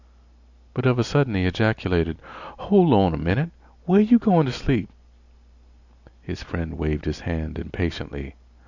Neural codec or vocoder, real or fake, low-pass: none; real; 7.2 kHz